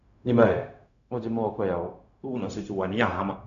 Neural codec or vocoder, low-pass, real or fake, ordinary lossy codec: codec, 16 kHz, 0.4 kbps, LongCat-Audio-Codec; 7.2 kHz; fake; none